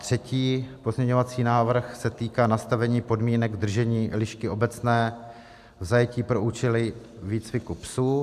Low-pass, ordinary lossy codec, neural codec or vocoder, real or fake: 14.4 kHz; AAC, 96 kbps; none; real